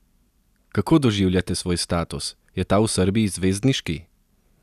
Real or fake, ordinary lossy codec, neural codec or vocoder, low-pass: real; none; none; 14.4 kHz